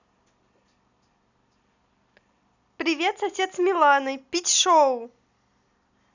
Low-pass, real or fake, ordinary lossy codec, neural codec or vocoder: 7.2 kHz; real; none; none